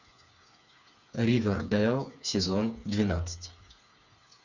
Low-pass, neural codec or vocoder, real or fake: 7.2 kHz; codec, 16 kHz, 4 kbps, FreqCodec, smaller model; fake